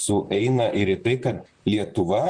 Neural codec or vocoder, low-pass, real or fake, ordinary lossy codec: vocoder, 44.1 kHz, 128 mel bands, Pupu-Vocoder; 9.9 kHz; fake; Opus, 64 kbps